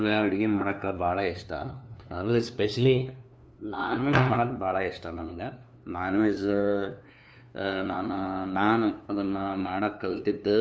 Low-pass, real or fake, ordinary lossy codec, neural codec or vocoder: none; fake; none; codec, 16 kHz, 2 kbps, FunCodec, trained on LibriTTS, 25 frames a second